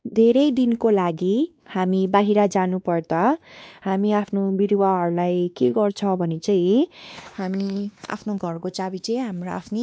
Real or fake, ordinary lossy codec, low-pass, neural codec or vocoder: fake; none; none; codec, 16 kHz, 2 kbps, X-Codec, WavLM features, trained on Multilingual LibriSpeech